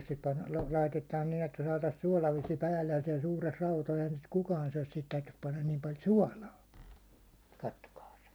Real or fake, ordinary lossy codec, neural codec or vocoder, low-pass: fake; none; vocoder, 44.1 kHz, 128 mel bands every 256 samples, BigVGAN v2; none